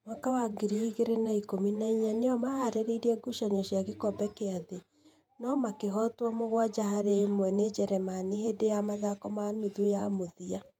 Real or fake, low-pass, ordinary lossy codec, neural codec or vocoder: fake; 19.8 kHz; MP3, 96 kbps; vocoder, 48 kHz, 128 mel bands, Vocos